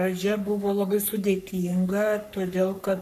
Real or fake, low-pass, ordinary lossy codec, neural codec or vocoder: fake; 14.4 kHz; MP3, 96 kbps; codec, 44.1 kHz, 3.4 kbps, Pupu-Codec